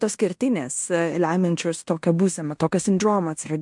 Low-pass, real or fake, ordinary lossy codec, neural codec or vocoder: 10.8 kHz; fake; MP3, 64 kbps; codec, 16 kHz in and 24 kHz out, 0.9 kbps, LongCat-Audio-Codec, fine tuned four codebook decoder